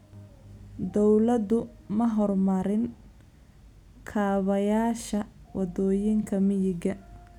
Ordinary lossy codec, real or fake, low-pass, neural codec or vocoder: none; real; 19.8 kHz; none